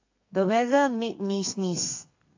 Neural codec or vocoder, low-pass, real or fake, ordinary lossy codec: codec, 32 kHz, 1.9 kbps, SNAC; 7.2 kHz; fake; AAC, 48 kbps